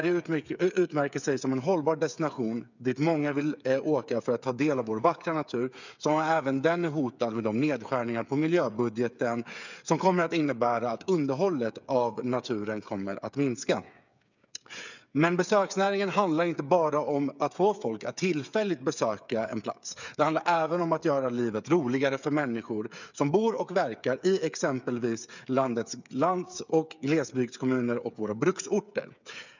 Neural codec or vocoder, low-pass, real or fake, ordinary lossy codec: codec, 16 kHz, 8 kbps, FreqCodec, smaller model; 7.2 kHz; fake; none